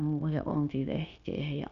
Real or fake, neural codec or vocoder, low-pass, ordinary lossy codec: fake; codec, 16 kHz, 0.9 kbps, LongCat-Audio-Codec; 7.2 kHz; none